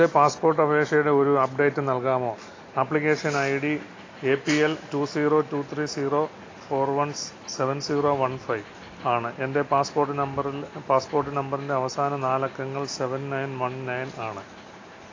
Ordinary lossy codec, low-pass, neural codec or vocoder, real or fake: AAC, 32 kbps; 7.2 kHz; none; real